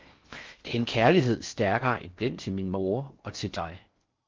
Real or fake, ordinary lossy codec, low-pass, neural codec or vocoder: fake; Opus, 32 kbps; 7.2 kHz; codec, 16 kHz in and 24 kHz out, 0.6 kbps, FocalCodec, streaming, 4096 codes